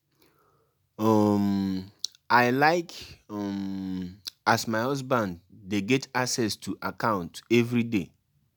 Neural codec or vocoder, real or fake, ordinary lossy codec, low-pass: none; real; none; none